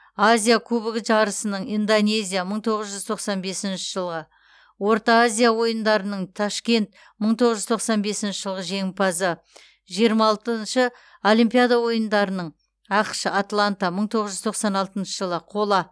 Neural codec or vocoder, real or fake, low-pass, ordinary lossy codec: none; real; none; none